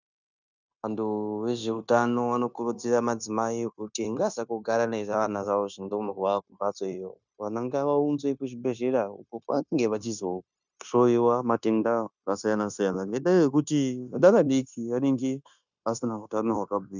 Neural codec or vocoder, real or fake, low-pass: codec, 16 kHz, 0.9 kbps, LongCat-Audio-Codec; fake; 7.2 kHz